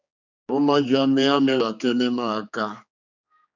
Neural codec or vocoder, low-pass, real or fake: codec, 16 kHz, 4 kbps, X-Codec, HuBERT features, trained on general audio; 7.2 kHz; fake